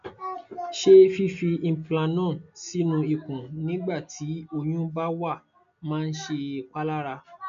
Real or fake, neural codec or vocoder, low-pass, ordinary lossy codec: real; none; 7.2 kHz; MP3, 64 kbps